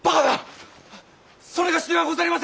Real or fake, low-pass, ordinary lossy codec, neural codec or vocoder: real; none; none; none